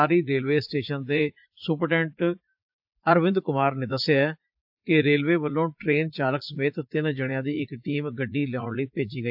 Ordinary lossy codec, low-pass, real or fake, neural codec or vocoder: AAC, 48 kbps; 5.4 kHz; fake; vocoder, 44.1 kHz, 80 mel bands, Vocos